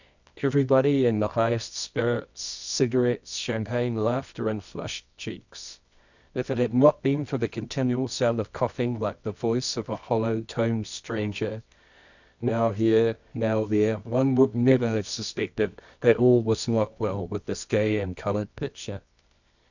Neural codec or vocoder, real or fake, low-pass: codec, 24 kHz, 0.9 kbps, WavTokenizer, medium music audio release; fake; 7.2 kHz